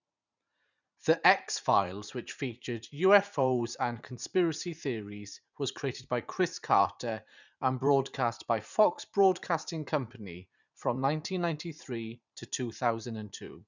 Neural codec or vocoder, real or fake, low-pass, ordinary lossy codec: vocoder, 44.1 kHz, 80 mel bands, Vocos; fake; 7.2 kHz; none